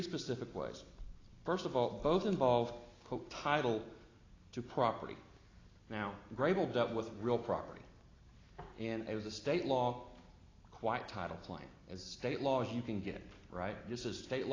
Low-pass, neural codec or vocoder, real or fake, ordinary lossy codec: 7.2 kHz; none; real; AAC, 32 kbps